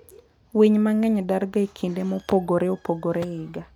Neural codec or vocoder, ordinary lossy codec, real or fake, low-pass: none; none; real; 19.8 kHz